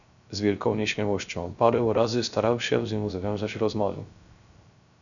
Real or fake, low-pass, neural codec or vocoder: fake; 7.2 kHz; codec, 16 kHz, 0.3 kbps, FocalCodec